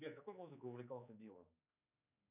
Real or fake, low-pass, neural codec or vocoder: fake; 3.6 kHz; codec, 16 kHz, 4 kbps, X-Codec, HuBERT features, trained on general audio